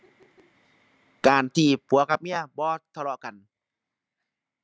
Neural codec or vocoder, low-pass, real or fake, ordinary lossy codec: none; none; real; none